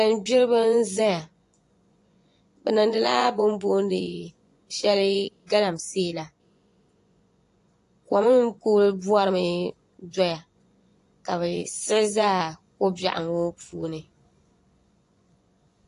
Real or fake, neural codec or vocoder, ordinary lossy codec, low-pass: fake; vocoder, 24 kHz, 100 mel bands, Vocos; AAC, 48 kbps; 10.8 kHz